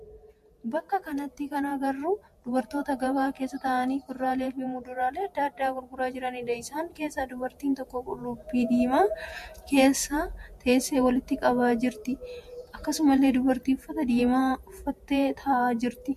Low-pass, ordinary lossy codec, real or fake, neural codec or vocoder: 14.4 kHz; MP3, 64 kbps; fake; vocoder, 48 kHz, 128 mel bands, Vocos